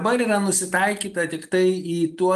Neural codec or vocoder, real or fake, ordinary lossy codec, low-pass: none; real; Opus, 32 kbps; 14.4 kHz